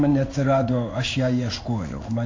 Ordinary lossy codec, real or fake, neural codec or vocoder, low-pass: AAC, 32 kbps; fake; codec, 16 kHz in and 24 kHz out, 1 kbps, XY-Tokenizer; 7.2 kHz